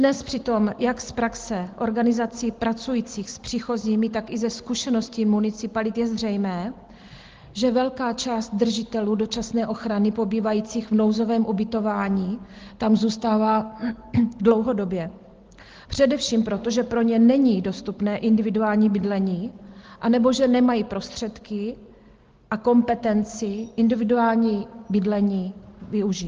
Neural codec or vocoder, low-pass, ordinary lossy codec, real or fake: none; 7.2 kHz; Opus, 16 kbps; real